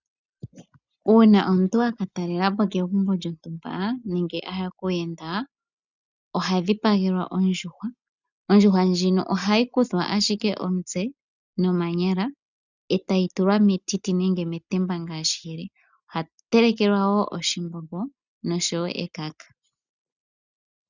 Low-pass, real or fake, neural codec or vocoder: 7.2 kHz; real; none